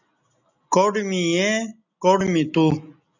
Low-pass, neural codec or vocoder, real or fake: 7.2 kHz; none; real